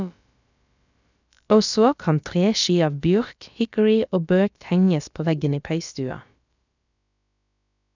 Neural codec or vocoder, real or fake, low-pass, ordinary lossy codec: codec, 16 kHz, about 1 kbps, DyCAST, with the encoder's durations; fake; 7.2 kHz; none